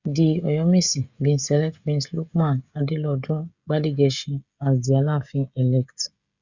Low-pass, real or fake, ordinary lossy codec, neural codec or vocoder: 7.2 kHz; fake; Opus, 64 kbps; codec, 16 kHz, 16 kbps, FreqCodec, smaller model